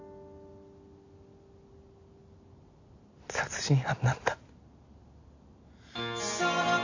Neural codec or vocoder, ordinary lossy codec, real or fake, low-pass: none; AAC, 48 kbps; real; 7.2 kHz